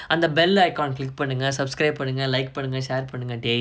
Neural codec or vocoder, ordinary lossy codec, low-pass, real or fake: none; none; none; real